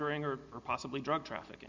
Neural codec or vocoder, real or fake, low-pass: none; real; 7.2 kHz